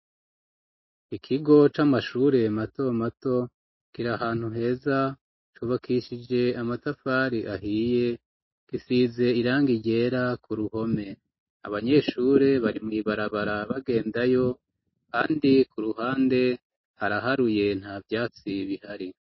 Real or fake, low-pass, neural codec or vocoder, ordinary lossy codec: real; 7.2 kHz; none; MP3, 24 kbps